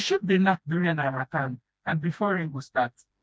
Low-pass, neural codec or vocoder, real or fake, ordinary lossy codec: none; codec, 16 kHz, 1 kbps, FreqCodec, smaller model; fake; none